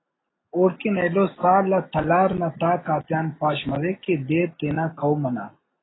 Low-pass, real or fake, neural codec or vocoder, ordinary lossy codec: 7.2 kHz; real; none; AAC, 16 kbps